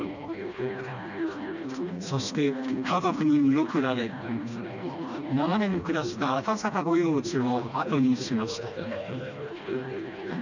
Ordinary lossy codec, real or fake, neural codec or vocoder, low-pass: none; fake; codec, 16 kHz, 1 kbps, FreqCodec, smaller model; 7.2 kHz